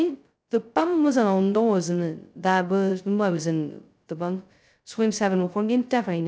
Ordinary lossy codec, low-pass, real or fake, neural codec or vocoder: none; none; fake; codec, 16 kHz, 0.2 kbps, FocalCodec